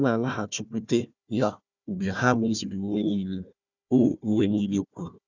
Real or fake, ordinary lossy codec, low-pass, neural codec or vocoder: fake; none; 7.2 kHz; codec, 16 kHz, 1 kbps, FunCodec, trained on Chinese and English, 50 frames a second